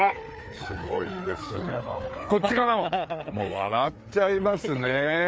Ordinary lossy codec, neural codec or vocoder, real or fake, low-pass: none; codec, 16 kHz, 4 kbps, FreqCodec, larger model; fake; none